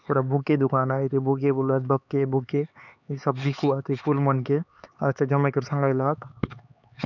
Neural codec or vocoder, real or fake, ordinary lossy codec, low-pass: codec, 16 kHz, 4 kbps, X-Codec, HuBERT features, trained on LibriSpeech; fake; none; 7.2 kHz